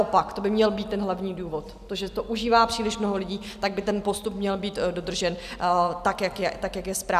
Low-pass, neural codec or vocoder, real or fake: 14.4 kHz; none; real